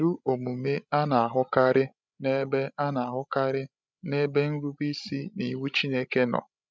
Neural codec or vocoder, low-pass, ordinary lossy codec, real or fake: codec, 16 kHz, 16 kbps, FreqCodec, larger model; none; none; fake